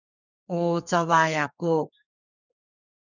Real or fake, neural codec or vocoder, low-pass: fake; codec, 32 kHz, 1.9 kbps, SNAC; 7.2 kHz